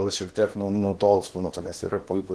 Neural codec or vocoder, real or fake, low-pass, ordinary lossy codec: codec, 16 kHz in and 24 kHz out, 0.8 kbps, FocalCodec, streaming, 65536 codes; fake; 10.8 kHz; Opus, 16 kbps